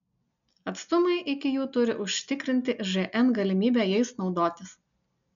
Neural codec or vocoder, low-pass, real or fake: none; 7.2 kHz; real